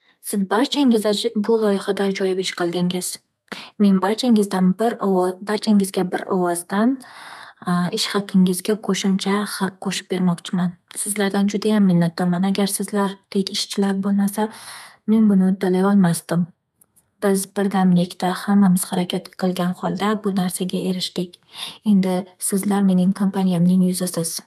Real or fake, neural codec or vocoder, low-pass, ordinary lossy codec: fake; codec, 32 kHz, 1.9 kbps, SNAC; 14.4 kHz; none